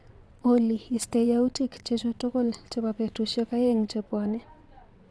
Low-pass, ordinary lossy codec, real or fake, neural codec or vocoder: none; none; fake; vocoder, 22.05 kHz, 80 mel bands, WaveNeXt